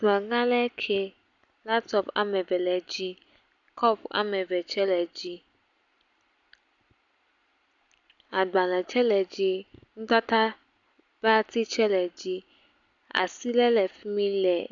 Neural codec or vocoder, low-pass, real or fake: none; 7.2 kHz; real